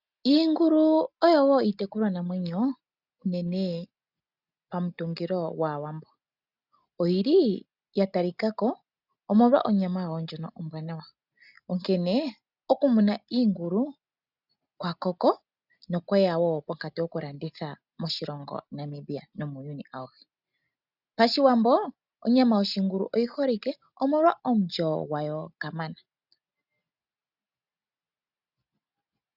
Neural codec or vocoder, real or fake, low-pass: none; real; 5.4 kHz